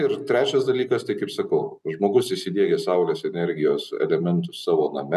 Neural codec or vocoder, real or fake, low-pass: none; real; 14.4 kHz